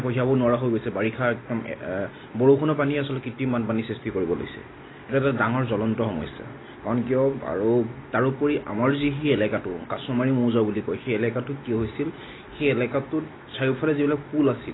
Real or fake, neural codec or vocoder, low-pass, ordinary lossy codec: real; none; 7.2 kHz; AAC, 16 kbps